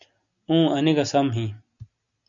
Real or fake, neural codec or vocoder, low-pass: real; none; 7.2 kHz